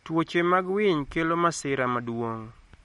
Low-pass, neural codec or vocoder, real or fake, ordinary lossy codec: 19.8 kHz; none; real; MP3, 48 kbps